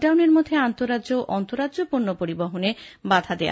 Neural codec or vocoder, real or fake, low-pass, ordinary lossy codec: none; real; none; none